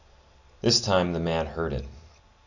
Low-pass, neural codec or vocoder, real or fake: 7.2 kHz; none; real